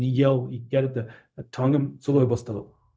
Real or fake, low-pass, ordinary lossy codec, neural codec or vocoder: fake; none; none; codec, 16 kHz, 0.4 kbps, LongCat-Audio-Codec